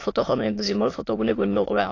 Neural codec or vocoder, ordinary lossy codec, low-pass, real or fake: autoencoder, 22.05 kHz, a latent of 192 numbers a frame, VITS, trained on many speakers; AAC, 32 kbps; 7.2 kHz; fake